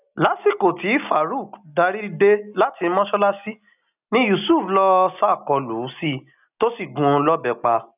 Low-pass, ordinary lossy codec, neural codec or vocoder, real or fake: 3.6 kHz; none; none; real